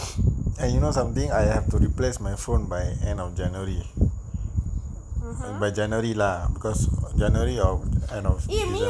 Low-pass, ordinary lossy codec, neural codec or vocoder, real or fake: none; none; none; real